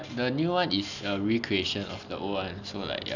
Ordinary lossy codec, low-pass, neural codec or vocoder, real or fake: none; 7.2 kHz; none; real